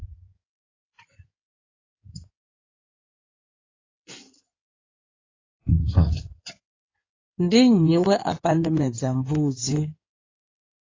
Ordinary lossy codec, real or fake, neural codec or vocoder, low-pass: AAC, 32 kbps; fake; codec, 16 kHz in and 24 kHz out, 2.2 kbps, FireRedTTS-2 codec; 7.2 kHz